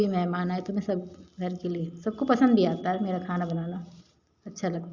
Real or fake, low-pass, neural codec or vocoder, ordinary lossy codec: real; 7.2 kHz; none; Opus, 64 kbps